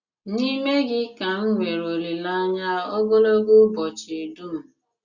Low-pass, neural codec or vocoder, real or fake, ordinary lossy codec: 7.2 kHz; none; real; Opus, 64 kbps